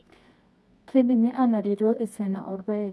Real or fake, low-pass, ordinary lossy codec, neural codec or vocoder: fake; none; none; codec, 24 kHz, 0.9 kbps, WavTokenizer, medium music audio release